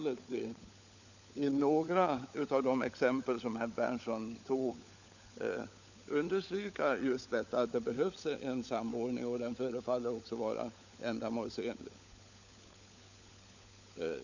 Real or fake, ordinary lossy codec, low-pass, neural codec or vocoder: fake; none; 7.2 kHz; codec, 16 kHz, 16 kbps, FunCodec, trained on LibriTTS, 50 frames a second